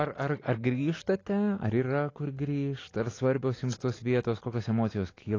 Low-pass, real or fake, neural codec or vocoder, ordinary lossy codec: 7.2 kHz; real; none; AAC, 32 kbps